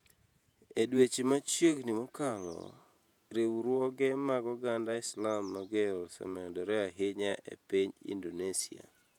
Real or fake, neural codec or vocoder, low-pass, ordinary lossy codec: fake; vocoder, 48 kHz, 128 mel bands, Vocos; 19.8 kHz; none